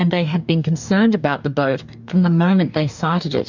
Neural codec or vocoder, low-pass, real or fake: codec, 44.1 kHz, 2.6 kbps, DAC; 7.2 kHz; fake